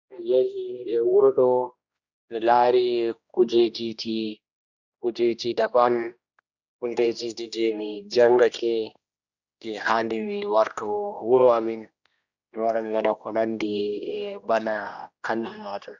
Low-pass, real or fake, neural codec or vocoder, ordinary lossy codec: 7.2 kHz; fake; codec, 16 kHz, 1 kbps, X-Codec, HuBERT features, trained on general audio; Opus, 64 kbps